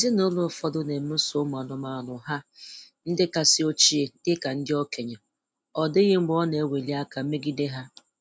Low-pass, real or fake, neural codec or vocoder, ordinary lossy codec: none; real; none; none